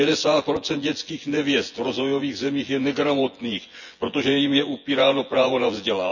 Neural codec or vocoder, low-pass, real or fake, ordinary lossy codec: vocoder, 24 kHz, 100 mel bands, Vocos; 7.2 kHz; fake; none